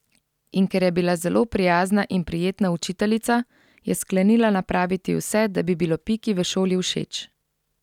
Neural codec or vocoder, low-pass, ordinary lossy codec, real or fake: none; 19.8 kHz; none; real